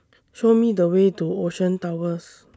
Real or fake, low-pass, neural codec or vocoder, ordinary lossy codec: real; none; none; none